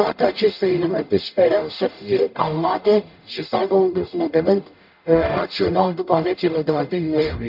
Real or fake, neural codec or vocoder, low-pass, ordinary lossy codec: fake; codec, 44.1 kHz, 0.9 kbps, DAC; 5.4 kHz; none